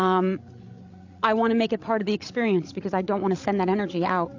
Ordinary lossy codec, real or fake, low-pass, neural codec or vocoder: AAC, 48 kbps; fake; 7.2 kHz; codec, 16 kHz, 16 kbps, FreqCodec, larger model